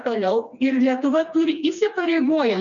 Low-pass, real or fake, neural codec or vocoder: 7.2 kHz; fake; codec, 16 kHz, 2 kbps, FreqCodec, smaller model